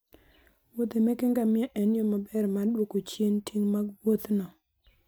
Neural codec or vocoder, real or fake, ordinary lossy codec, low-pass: none; real; none; none